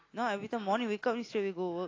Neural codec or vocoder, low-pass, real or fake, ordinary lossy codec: none; 7.2 kHz; real; AAC, 32 kbps